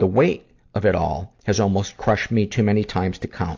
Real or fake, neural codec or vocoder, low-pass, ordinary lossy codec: real; none; 7.2 kHz; AAC, 48 kbps